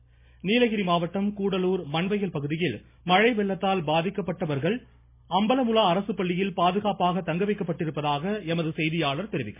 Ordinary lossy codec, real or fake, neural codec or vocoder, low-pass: MP3, 24 kbps; real; none; 3.6 kHz